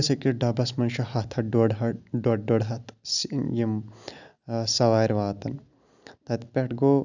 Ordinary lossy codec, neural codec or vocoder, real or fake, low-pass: none; none; real; 7.2 kHz